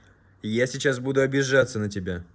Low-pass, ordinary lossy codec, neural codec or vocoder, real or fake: none; none; none; real